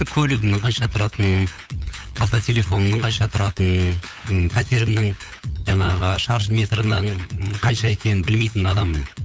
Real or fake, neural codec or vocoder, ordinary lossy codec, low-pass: fake; codec, 16 kHz, 8 kbps, FunCodec, trained on LibriTTS, 25 frames a second; none; none